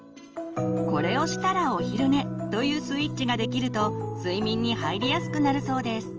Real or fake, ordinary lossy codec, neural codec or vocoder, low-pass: real; Opus, 24 kbps; none; 7.2 kHz